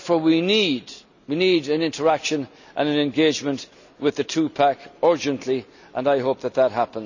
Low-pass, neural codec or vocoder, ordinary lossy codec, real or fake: 7.2 kHz; none; none; real